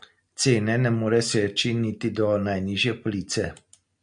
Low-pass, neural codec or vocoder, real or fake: 9.9 kHz; none; real